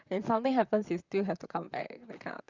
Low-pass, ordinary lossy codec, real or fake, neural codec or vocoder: 7.2 kHz; Opus, 64 kbps; fake; vocoder, 44.1 kHz, 128 mel bands, Pupu-Vocoder